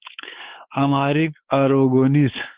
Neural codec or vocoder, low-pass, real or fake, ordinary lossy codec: codec, 16 kHz, 4 kbps, X-Codec, WavLM features, trained on Multilingual LibriSpeech; 3.6 kHz; fake; Opus, 16 kbps